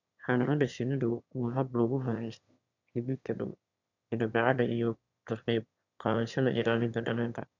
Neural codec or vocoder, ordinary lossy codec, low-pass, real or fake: autoencoder, 22.05 kHz, a latent of 192 numbers a frame, VITS, trained on one speaker; none; 7.2 kHz; fake